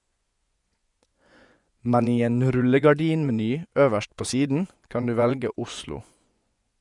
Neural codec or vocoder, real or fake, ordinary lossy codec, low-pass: vocoder, 24 kHz, 100 mel bands, Vocos; fake; none; 10.8 kHz